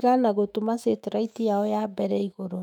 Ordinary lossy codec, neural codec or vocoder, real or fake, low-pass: none; autoencoder, 48 kHz, 128 numbers a frame, DAC-VAE, trained on Japanese speech; fake; 19.8 kHz